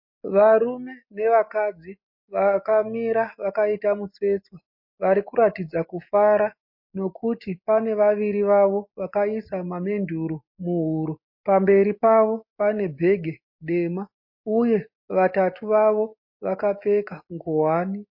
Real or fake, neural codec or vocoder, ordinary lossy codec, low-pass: real; none; MP3, 32 kbps; 5.4 kHz